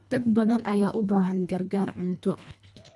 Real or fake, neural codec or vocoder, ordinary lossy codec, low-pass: fake; codec, 24 kHz, 1.5 kbps, HILCodec; none; none